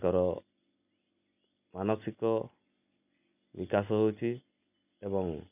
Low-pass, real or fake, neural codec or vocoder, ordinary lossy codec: 3.6 kHz; real; none; none